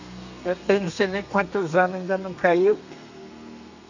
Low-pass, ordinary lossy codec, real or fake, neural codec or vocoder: 7.2 kHz; none; fake; codec, 32 kHz, 1.9 kbps, SNAC